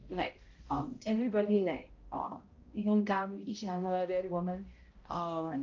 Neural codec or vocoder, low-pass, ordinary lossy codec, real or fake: codec, 16 kHz, 0.5 kbps, X-Codec, HuBERT features, trained on balanced general audio; 7.2 kHz; Opus, 32 kbps; fake